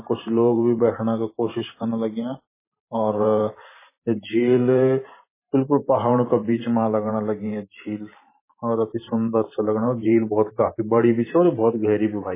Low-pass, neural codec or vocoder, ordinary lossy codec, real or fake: 3.6 kHz; vocoder, 44.1 kHz, 128 mel bands every 512 samples, BigVGAN v2; MP3, 16 kbps; fake